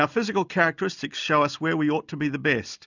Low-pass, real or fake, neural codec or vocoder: 7.2 kHz; real; none